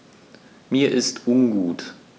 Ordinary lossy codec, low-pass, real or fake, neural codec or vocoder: none; none; real; none